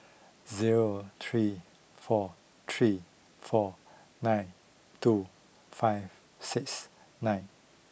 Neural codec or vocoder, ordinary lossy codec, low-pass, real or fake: none; none; none; real